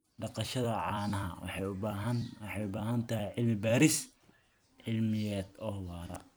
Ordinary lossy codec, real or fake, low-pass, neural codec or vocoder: none; fake; none; vocoder, 44.1 kHz, 128 mel bands every 256 samples, BigVGAN v2